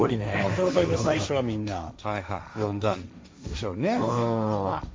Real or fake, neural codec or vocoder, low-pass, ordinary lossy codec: fake; codec, 16 kHz, 1.1 kbps, Voila-Tokenizer; none; none